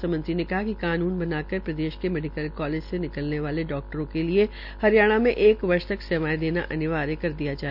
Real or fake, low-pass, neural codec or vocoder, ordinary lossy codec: real; 5.4 kHz; none; none